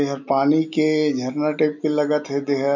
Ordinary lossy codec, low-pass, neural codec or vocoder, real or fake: none; 7.2 kHz; none; real